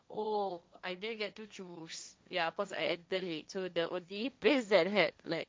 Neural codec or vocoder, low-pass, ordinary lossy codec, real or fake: codec, 16 kHz, 1.1 kbps, Voila-Tokenizer; none; none; fake